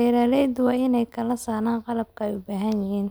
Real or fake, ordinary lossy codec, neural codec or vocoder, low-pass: real; none; none; none